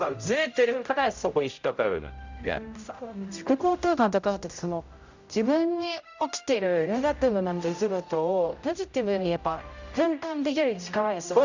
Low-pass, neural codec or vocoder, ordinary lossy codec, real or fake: 7.2 kHz; codec, 16 kHz, 0.5 kbps, X-Codec, HuBERT features, trained on general audio; Opus, 64 kbps; fake